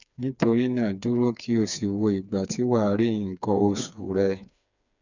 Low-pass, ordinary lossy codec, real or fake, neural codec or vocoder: 7.2 kHz; none; fake; codec, 16 kHz, 4 kbps, FreqCodec, smaller model